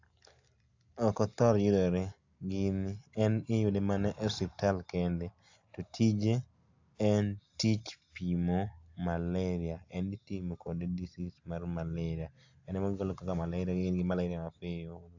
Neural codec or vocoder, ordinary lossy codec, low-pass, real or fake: none; AAC, 48 kbps; 7.2 kHz; real